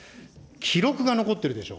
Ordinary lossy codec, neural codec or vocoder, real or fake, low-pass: none; none; real; none